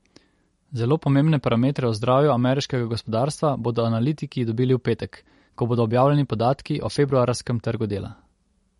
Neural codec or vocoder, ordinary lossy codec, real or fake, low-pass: none; MP3, 48 kbps; real; 19.8 kHz